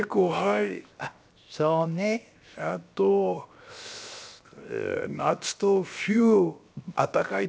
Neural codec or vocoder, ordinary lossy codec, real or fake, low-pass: codec, 16 kHz, 0.7 kbps, FocalCodec; none; fake; none